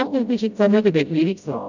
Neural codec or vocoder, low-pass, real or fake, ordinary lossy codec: codec, 16 kHz, 0.5 kbps, FreqCodec, smaller model; 7.2 kHz; fake; none